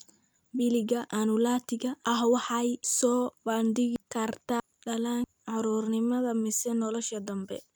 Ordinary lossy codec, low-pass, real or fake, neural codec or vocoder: none; none; fake; vocoder, 44.1 kHz, 128 mel bands every 256 samples, BigVGAN v2